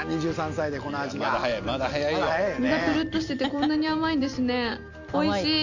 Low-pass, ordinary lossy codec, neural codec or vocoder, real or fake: 7.2 kHz; none; none; real